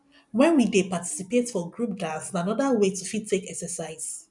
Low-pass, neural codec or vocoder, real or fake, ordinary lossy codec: 10.8 kHz; none; real; none